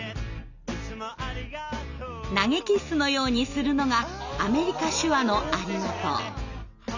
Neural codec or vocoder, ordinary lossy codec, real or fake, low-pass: none; none; real; 7.2 kHz